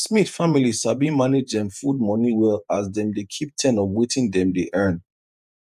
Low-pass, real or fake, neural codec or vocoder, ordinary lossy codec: 14.4 kHz; fake; vocoder, 48 kHz, 128 mel bands, Vocos; none